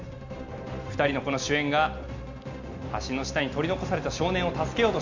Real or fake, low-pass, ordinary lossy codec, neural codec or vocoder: real; 7.2 kHz; MP3, 64 kbps; none